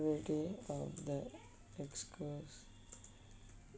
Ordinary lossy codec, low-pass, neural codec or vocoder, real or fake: none; none; none; real